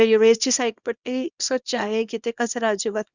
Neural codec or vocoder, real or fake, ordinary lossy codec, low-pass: codec, 24 kHz, 0.9 kbps, WavTokenizer, small release; fake; Opus, 64 kbps; 7.2 kHz